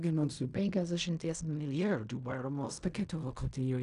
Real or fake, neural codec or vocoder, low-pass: fake; codec, 16 kHz in and 24 kHz out, 0.4 kbps, LongCat-Audio-Codec, fine tuned four codebook decoder; 10.8 kHz